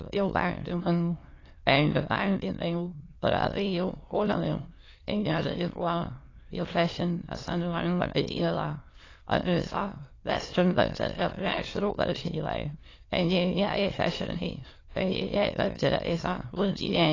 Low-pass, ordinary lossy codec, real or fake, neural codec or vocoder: 7.2 kHz; AAC, 32 kbps; fake; autoencoder, 22.05 kHz, a latent of 192 numbers a frame, VITS, trained on many speakers